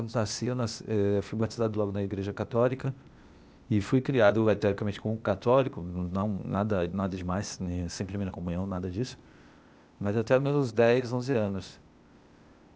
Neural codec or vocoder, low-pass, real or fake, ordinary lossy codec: codec, 16 kHz, 0.8 kbps, ZipCodec; none; fake; none